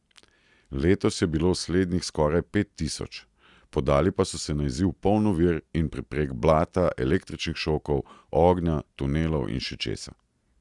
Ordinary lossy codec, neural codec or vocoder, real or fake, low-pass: Opus, 64 kbps; none; real; 10.8 kHz